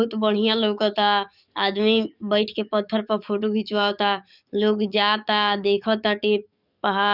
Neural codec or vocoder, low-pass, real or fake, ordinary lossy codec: codec, 44.1 kHz, 7.8 kbps, DAC; 5.4 kHz; fake; none